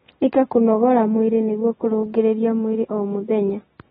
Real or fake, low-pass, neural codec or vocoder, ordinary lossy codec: real; 19.8 kHz; none; AAC, 16 kbps